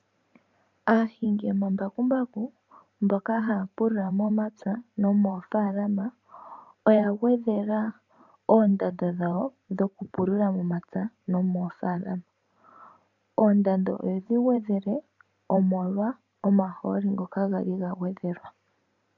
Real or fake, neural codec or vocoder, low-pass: fake; vocoder, 44.1 kHz, 128 mel bands every 512 samples, BigVGAN v2; 7.2 kHz